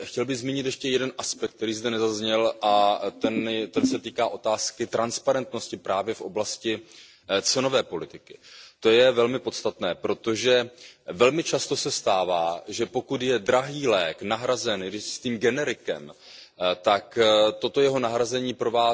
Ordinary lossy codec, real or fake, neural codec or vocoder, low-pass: none; real; none; none